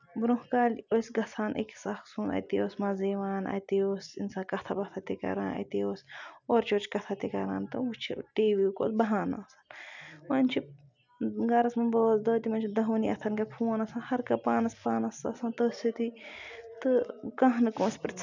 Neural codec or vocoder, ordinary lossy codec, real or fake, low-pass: none; none; real; 7.2 kHz